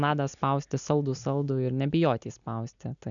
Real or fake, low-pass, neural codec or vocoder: real; 7.2 kHz; none